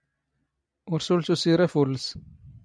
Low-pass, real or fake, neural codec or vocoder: 9.9 kHz; real; none